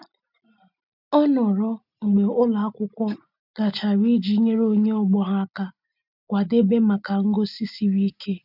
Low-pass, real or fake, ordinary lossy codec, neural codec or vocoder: 5.4 kHz; real; none; none